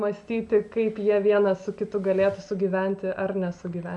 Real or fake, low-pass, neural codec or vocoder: real; 10.8 kHz; none